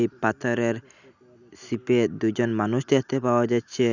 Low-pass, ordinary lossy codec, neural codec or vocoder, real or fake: 7.2 kHz; none; none; real